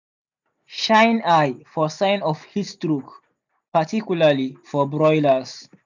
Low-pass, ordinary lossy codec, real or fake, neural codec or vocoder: 7.2 kHz; none; real; none